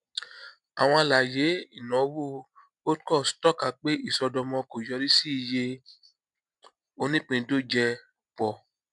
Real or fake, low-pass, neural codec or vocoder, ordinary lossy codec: real; 10.8 kHz; none; none